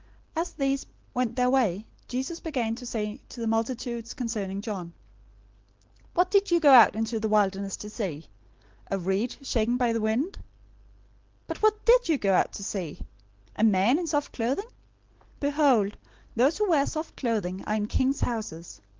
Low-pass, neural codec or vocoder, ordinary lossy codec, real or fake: 7.2 kHz; none; Opus, 16 kbps; real